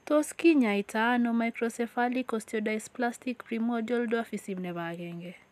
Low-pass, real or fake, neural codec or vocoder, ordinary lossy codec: 14.4 kHz; real; none; none